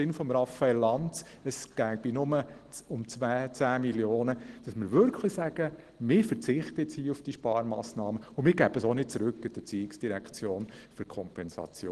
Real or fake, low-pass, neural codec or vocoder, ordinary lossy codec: real; 10.8 kHz; none; Opus, 16 kbps